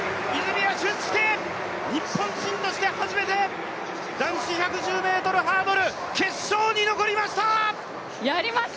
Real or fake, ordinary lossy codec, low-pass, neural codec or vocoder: real; none; none; none